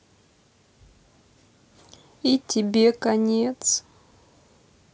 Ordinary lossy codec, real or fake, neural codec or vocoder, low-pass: none; real; none; none